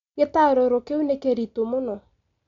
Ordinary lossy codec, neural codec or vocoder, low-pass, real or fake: MP3, 64 kbps; none; 7.2 kHz; real